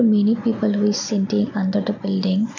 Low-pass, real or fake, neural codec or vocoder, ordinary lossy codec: 7.2 kHz; real; none; none